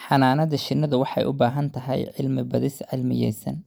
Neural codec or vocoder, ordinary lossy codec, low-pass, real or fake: none; none; none; real